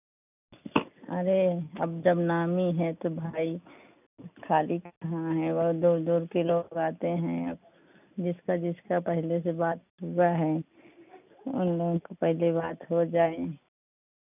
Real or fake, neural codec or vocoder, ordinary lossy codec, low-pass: real; none; none; 3.6 kHz